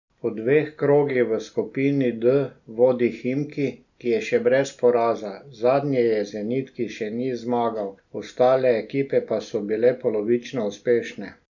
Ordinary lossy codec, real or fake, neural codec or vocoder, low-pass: none; real; none; 7.2 kHz